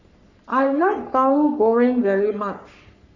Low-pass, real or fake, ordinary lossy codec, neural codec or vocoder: 7.2 kHz; fake; none; codec, 44.1 kHz, 3.4 kbps, Pupu-Codec